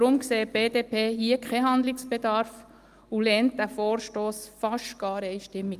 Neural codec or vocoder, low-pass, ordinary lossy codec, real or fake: none; 14.4 kHz; Opus, 32 kbps; real